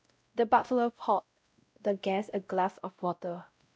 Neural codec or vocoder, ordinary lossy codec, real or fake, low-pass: codec, 16 kHz, 0.5 kbps, X-Codec, WavLM features, trained on Multilingual LibriSpeech; none; fake; none